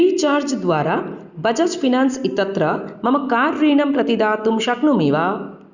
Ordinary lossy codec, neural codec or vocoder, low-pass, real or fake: Opus, 64 kbps; none; 7.2 kHz; real